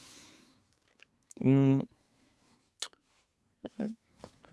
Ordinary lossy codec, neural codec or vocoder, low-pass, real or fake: none; codec, 24 kHz, 1 kbps, SNAC; none; fake